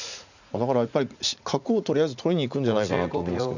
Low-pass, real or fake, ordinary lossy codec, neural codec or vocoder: 7.2 kHz; fake; none; vocoder, 44.1 kHz, 80 mel bands, Vocos